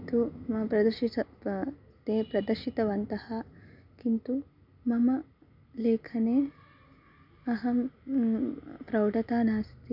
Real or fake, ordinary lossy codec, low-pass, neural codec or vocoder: real; none; 5.4 kHz; none